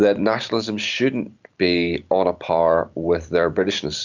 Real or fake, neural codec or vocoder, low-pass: fake; vocoder, 44.1 kHz, 128 mel bands every 256 samples, BigVGAN v2; 7.2 kHz